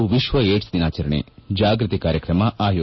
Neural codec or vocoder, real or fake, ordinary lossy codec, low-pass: none; real; MP3, 24 kbps; 7.2 kHz